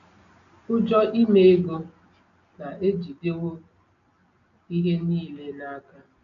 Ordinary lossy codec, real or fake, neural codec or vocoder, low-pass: none; real; none; 7.2 kHz